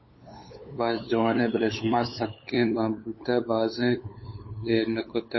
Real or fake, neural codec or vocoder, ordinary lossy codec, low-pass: fake; codec, 16 kHz, 8 kbps, FunCodec, trained on LibriTTS, 25 frames a second; MP3, 24 kbps; 7.2 kHz